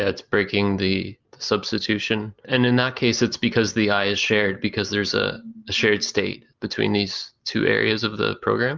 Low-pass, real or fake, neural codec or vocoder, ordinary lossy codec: 7.2 kHz; real; none; Opus, 24 kbps